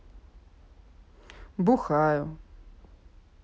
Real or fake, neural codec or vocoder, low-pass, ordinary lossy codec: real; none; none; none